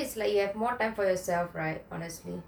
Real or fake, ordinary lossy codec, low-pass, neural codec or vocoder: real; none; none; none